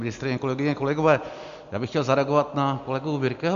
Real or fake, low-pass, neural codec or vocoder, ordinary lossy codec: real; 7.2 kHz; none; MP3, 64 kbps